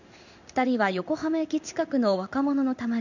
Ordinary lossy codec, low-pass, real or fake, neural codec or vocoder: none; 7.2 kHz; fake; codec, 16 kHz in and 24 kHz out, 1 kbps, XY-Tokenizer